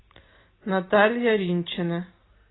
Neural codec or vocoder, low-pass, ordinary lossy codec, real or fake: none; 7.2 kHz; AAC, 16 kbps; real